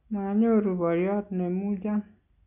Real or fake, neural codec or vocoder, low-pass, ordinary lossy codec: real; none; 3.6 kHz; none